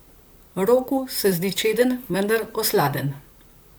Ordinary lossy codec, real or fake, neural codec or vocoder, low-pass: none; fake; vocoder, 44.1 kHz, 128 mel bands, Pupu-Vocoder; none